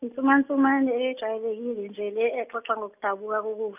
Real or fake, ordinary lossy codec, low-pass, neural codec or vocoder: real; none; 3.6 kHz; none